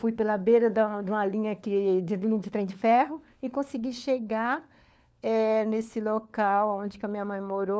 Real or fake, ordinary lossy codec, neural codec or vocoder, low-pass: fake; none; codec, 16 kHz, 4 kbps, FunCodec, trained on LibriTTS, 50 frames a second; none